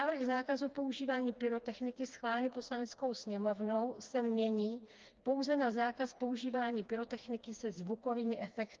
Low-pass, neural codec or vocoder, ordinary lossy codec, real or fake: 7.2 kHz; codec, 16 kHz, 2 kbps, FreqCodec, smaller model; Opus, 24 kbps; fake